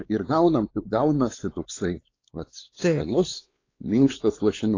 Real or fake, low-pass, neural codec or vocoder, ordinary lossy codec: fake; 7.2 kHz; codec, 16 kHz, 4 kbps, X-Codec, WavLM features, trained on Multilingual LibriSpeech; AAC, 32 kbps